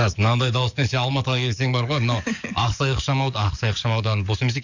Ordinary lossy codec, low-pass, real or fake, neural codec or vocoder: none; 7.2 kHz; fake; codec, 44.1 kHz, 7.8 kbps, DAC